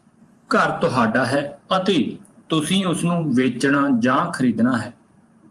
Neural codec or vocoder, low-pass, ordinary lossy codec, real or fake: vocoder, 44.1 kHz, 128 mel bands every 512 samples, BigVGAN v2; 10.8 kHz; Opus, 32 kbps; fake